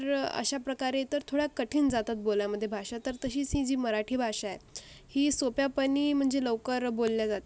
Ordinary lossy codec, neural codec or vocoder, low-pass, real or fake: none; none; none; real